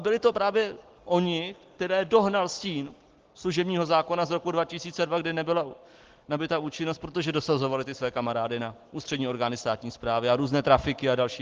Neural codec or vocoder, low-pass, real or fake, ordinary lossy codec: none; 7.2 kHz; real; Opus, 16 kbps